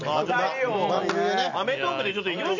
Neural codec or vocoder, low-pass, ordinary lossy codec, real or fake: none; 7.2 kHz; none; real